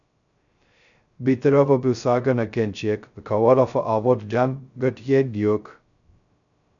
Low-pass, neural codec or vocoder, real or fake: 7.2 kHz; codec, 16 kHz, 0.2 kbps, FocalCodec; fake